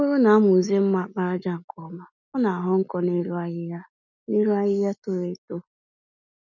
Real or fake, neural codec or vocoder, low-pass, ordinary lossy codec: real; none; 7.2 kHz; none